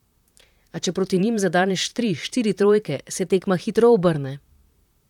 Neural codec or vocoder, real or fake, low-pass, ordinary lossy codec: vocoder, 44.1 kHz, 128 mel bands, Pupu-Vocoder; fake; 19.8 kHz; none